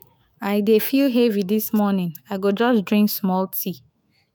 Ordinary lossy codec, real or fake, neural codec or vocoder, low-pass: none; fake; autoencoder, 48 kHz, 128 numbers a frame, DAC-VAE, trained on Japanese speech; none